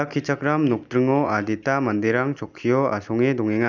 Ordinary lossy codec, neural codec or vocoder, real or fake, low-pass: none; none; real; 7.2 kHz